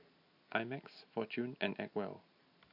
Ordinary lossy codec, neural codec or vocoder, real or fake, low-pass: AAC, 48 kbps; none; real; 5.4 kHz